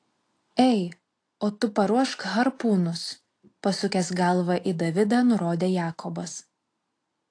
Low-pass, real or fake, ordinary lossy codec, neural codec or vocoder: 9.9 kHz; real; AAC, 48 kbps; none